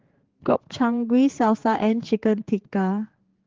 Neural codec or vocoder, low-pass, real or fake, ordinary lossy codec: codec, 16 kHz, 4 kbps, X-Codec, HuBERT features, trained on general audio; 7.2 kHz; fake; Opus, 16 kbps